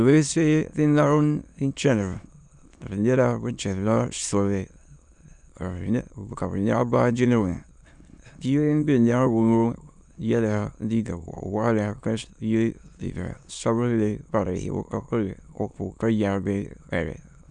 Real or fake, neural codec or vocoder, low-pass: fake; autoencoder, 22.05 kHz, a latent of 192 numbers a frame, VITS, trained on many speakers; 9.9 kHz